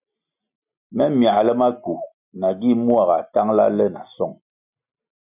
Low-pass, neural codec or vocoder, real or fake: 3.6 kHz; none; real